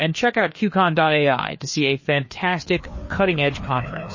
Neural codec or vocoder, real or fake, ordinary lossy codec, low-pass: codec, 16 kHz, 2 kbps, FreqCodec, larger model; fake; MP3, 32 kbps; 7.2 kHz